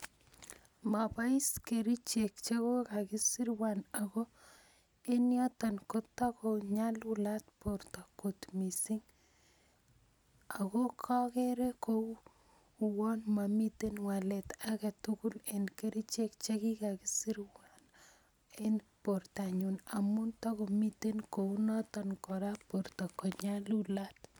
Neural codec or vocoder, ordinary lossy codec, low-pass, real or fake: none; none; none; real